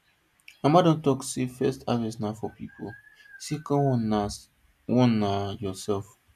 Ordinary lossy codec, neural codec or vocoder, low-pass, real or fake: none; none; 14.4 kHz; real